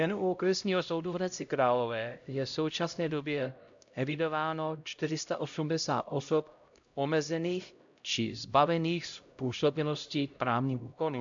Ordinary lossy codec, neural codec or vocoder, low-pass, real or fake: AAC, 64 kbps; codec, 16 kHz, 0.5 kbps, X-Codec, HuBERT features, trained on LibriSpeech; 7.2 kHz; fake